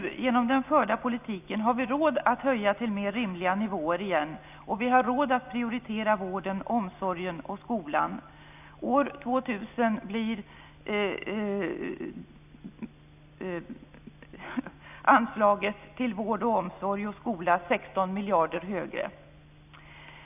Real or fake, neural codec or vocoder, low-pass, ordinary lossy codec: real; none; 3.6 kHz; none